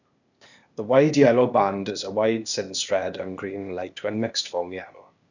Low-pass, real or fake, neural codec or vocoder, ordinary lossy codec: 7.2 kHz; fake; codec, 16 kHz, 0.8 kbps, ZipCodec; none